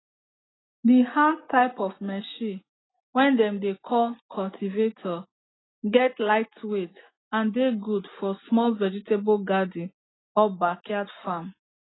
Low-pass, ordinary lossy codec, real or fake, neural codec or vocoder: 7.2 kHz; AAC, 16 kbps; real; none